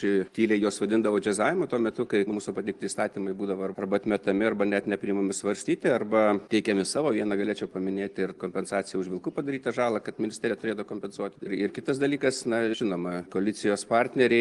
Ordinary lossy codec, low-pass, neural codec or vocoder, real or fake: Opus, 24 kbps; 10.8 kHz; none; real